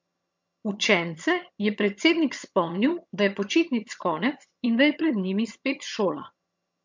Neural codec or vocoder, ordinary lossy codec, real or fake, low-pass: vocoder, 22.05 kHz, 80 mel bands, HiFi-GAN; MP3, 48 kbps; fake; 7.2 kHz